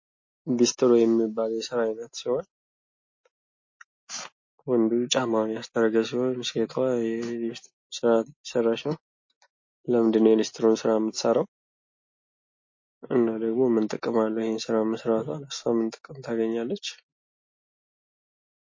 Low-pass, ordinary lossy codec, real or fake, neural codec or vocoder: 7.2 kHz; MP3, 32 kbps; real; none